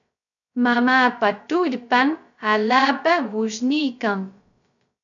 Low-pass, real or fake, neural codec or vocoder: 7.2 kHz; fake; codec, 16 kHz, 0.2 kbps, FocalCodec